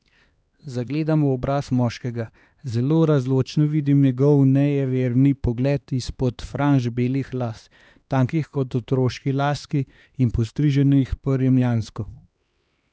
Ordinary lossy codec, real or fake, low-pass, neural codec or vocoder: none; fake; none; codec, 16 kHz, 2 kbps, X-Codec, HuBERT features, trained on LibriSpeech